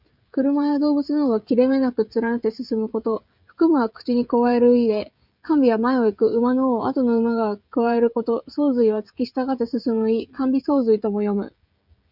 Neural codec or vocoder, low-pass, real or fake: codec, 16 kHz, 16 kbps, FreqCodec, smaller model; 5.4 kHz; fake